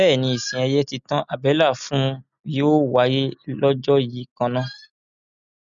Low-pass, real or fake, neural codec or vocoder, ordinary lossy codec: 7.2 kHz; real; none; none